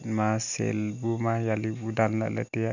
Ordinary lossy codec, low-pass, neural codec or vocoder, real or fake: none; 7.2 kHz; none; real